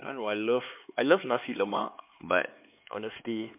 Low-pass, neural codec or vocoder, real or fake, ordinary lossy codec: 3.6 kHz; codec, 16 kHz, 4 kbps, X-Codec, HuBERT features, trained on LibriSpeech; fake; MP3, 32 kbps